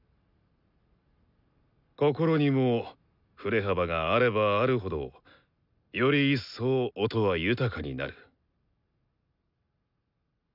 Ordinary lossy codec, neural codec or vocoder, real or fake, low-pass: none; none; real; 5.4 kHz